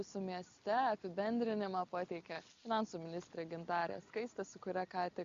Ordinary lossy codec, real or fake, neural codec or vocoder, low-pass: Opus, 64 kbps; real; none; 7.2 kHz